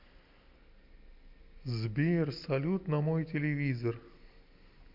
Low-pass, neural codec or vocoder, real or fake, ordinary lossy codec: 5.4 kHz; none; real; MP3, 48 kbps